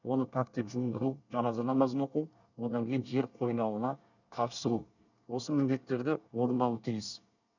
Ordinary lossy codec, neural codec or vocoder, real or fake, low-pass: none; codec, 24 kHz, 1 kbps, SNAC; fake; 7.2 kHz